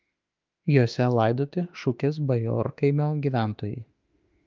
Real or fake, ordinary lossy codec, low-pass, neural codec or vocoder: fake; Opus, 24 kbps; 7.2 kHz; autoencoder, 48 kHz, 32 numbers a frame, DAC-VAE, trained on Japanese speech